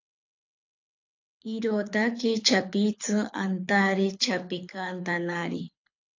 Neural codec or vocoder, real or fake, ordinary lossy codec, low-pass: codec, 24 kHz, 6 kbps, HILCodec; fake; AAC, 48 kbps; 7.2 kHz